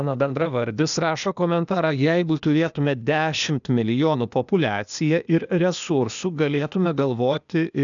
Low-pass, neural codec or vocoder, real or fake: 7.2 kHz; codec, 16 kHz, 0.8 kbps, ZipCodec; fake